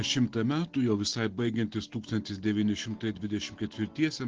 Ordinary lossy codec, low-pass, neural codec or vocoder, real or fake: Opus, 16 kbps; 7.2 kHz; none; real